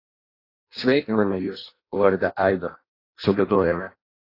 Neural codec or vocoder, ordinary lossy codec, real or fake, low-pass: codec, 16 kHz in and 24 kHz out, 0.6 kbps, FireRedTTS-2 codec; AAC, 24 kbps; fake; 5.4 kHz